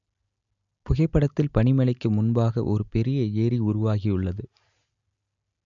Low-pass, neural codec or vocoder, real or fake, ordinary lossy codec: 7.2 kHz; none; real; none